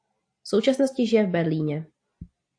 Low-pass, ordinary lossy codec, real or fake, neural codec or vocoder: 9.9 kHz; AAC, 48 kbps; real; none